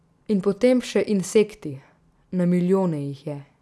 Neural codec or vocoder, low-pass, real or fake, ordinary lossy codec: none; none; real; none